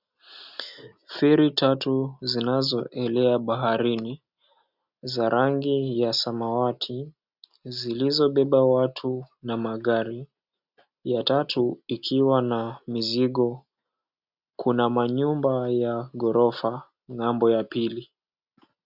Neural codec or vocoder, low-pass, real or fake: none; 5.4 kHz; real